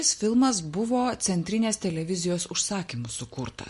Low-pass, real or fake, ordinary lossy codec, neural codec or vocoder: 14.4 kHz; real; MP3, 48 kbps; none